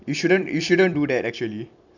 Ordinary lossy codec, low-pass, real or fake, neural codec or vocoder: none; 7.2 kHz; fake; vocoder, 44.1 kHz, 128 mel bands every 256 samples, BigVGAN v2